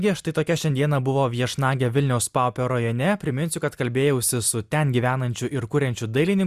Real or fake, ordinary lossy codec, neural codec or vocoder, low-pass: real; Opus, 64 kbps; none; 14.4 kHz